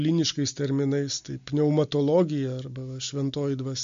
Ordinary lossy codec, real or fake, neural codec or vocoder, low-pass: MP3, 48 kbps; real; none; 7.2 kHz